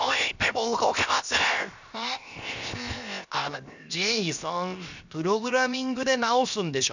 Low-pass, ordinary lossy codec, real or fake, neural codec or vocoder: 7.2 kHz; none; fake; codec, 16 kHz, 0.7 kbps, FocalCodec